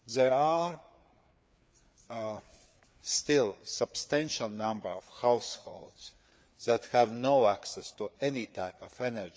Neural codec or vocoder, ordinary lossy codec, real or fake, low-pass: codec, 16 kHz, 4 kbps, FreqCodec, larger model; none; fake; none